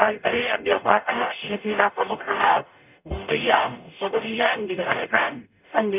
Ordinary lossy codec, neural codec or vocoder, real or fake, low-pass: none; codec, 44.1 kHz, 0.9 kbps, DAC; fake; 3.6 kHz